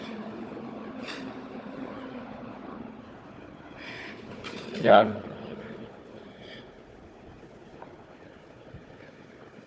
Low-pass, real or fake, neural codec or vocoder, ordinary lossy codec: none; fake; codec, 16 kHz, 4 kbps, FunCodec, trained on Chinese and English, 50 frames a second; none